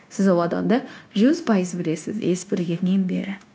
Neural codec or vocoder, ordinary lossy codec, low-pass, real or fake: codec, 16 kHz, 0.9 kbps, LongCat-Audio-Codec; none; none; fake